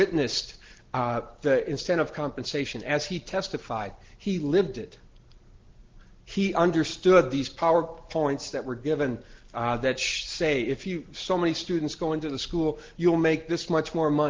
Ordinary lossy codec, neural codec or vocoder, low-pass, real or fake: Opus, 16 kbps; none; 7.2 kHz; real